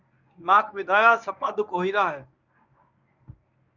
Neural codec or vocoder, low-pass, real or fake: codec, 24 kHz, 0.9 kbps, WavTokenizer, medium speech release version 1; 7.2 kHz; fake